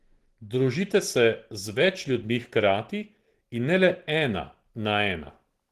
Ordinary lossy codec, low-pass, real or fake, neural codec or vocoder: Opus, 16 kbps; 14.4 kHz; real; none